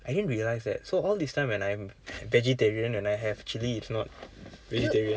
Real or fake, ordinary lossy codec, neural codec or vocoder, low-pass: real; none; none; none